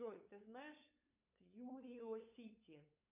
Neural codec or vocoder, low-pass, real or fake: codec, 16 kHz, 8 kbps, FunCodec, trained on LibriTTS, 25 frames a second; 3.6 kHz; fake